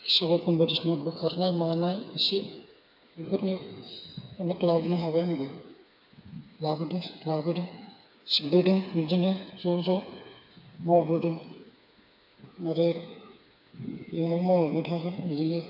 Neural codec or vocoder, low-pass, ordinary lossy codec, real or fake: codec, 16 kHz, 4 kbps, FreqCodec, smaller model; 5.4 kHz; none; fake